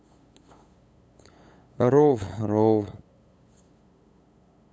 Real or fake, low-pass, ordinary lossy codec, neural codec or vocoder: fake; none; none; codec, 16 kHz, 8 kbps, FunCodec, trained on LibriTTS, 25 frames a second